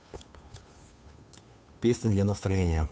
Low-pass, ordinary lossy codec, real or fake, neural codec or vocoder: none; none; fake; codec, 16 kHz, 2 kbps, FunCodec, trained on Chinese and English, 25 frames a second